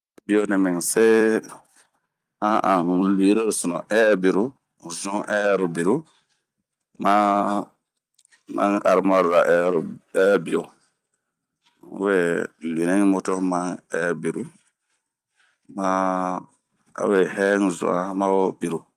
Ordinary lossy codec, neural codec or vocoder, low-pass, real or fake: Opus, 32 kbps; vocoder, 44.1 kHz, 128 mel bands every 256 samples, BigVGAN v2; 14.4 kHz; fake